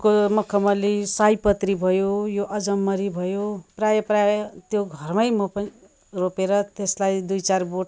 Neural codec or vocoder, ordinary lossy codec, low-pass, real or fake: none; none; none; real